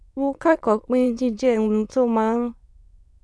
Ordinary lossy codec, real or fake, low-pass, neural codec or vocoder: none; fake; none; autoencoder, 22.05 kHz, a latent of 192 numbers a frame, VITS, trained on many speakers